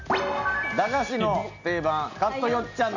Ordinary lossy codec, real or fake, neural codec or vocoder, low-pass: Opus, 64 kbps; real; none; 7.2 kHz